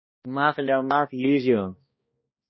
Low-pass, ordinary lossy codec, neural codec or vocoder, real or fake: 7.2 kHz; MP3, 24 kbps; codec, 16 kHz, 1 kbps, X-Codec, HuBERT features, trained on balanced general audio; fake